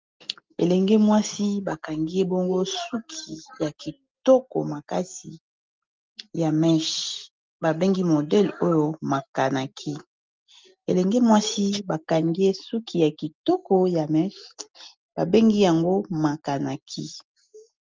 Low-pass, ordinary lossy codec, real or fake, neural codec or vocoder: 7.2 kHz; Opus, 32 kbps; real; none